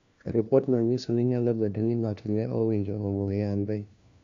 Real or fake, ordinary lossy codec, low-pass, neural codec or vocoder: fake; AAC, 64 kbps; 7.2 kHz; codec, 16 kHz, 1 kbps, FunCodec, trained on LibriTTS, 50 frames a second